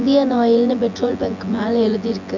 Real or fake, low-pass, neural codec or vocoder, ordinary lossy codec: fake; 7.2 kHz; vocoder, 24 kHz, 100 mel bands, Vocos; none